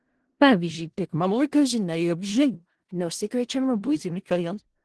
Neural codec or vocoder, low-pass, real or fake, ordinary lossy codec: codec, 16 kHz in and 24 kHz out, 0.4 kbps, LongCat-Audio-Codec, four codebook decoder; 10.8 kHz; fake; Opus, 16 kbps